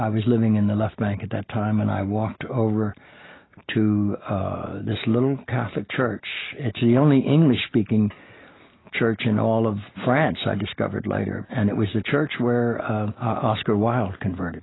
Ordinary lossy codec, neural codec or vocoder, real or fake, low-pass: AAC, 16 kbps; none; real; 7.2 kHz